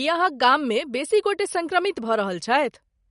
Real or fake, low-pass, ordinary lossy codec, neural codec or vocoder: real; 10.8 kHz; MP3, 48 kbps; none